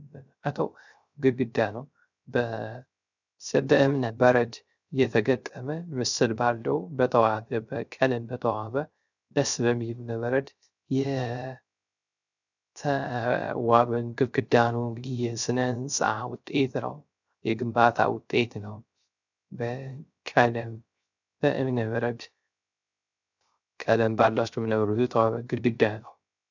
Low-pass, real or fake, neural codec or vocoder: 7.2 kHz; fake; codec, 16 kHz, 0.3 kbps, FocalCodec